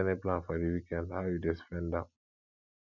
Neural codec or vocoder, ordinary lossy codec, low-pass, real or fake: none; none; 7.2 kHz; real